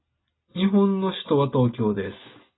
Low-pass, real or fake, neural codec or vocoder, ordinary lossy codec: 7.2 kHz; real; none; AAC, 16 kbps